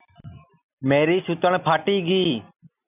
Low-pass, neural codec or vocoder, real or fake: 3.6 kHz; none; real